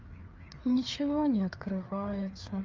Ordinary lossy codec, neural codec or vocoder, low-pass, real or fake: Opus, 32 kbps; codec, 16 kHz, 4 kbps, FreqCodec, larger model; 7.2 kHz; fake